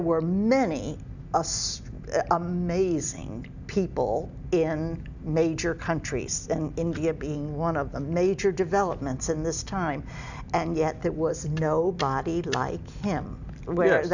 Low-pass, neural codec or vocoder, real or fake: 7.2 kHz; none; real